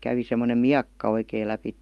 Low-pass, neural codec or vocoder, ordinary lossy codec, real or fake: 19.8 kHz; none; Opus, 32 kbps; real